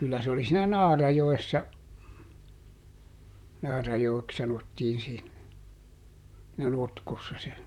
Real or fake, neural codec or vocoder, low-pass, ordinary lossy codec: fake; vocoder, 44.1 kHz, 128 mel bands, Pupu-Vocoder; 19.8 kHz; none